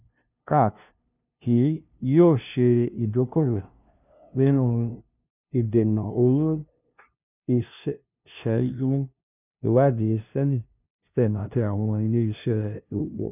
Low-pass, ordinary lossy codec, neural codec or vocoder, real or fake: 3.6 kHz; none; codec, 16 kHz, 0.5 kbps, FunCodec, trained on LibriTTS, 25 frames a second; fake